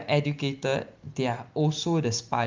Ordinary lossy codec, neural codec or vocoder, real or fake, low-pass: Opus, 32 kbps; none; real; 7.2 kHz